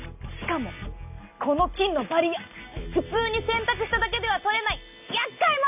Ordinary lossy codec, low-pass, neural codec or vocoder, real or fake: none; 3.6 kHz; none; real